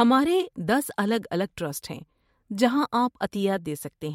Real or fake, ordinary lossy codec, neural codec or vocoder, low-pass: fake; MP3, 64 kbps; vocoder, 44.1 kHz, 128 mel bands every 512 samples, BigVGAN v2; 19.8 kHz